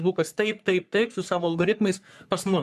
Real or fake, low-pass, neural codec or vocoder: fake; 14.4 kHz; codec, 44.1 kHz, 3.4 kbps, Pupu-Codec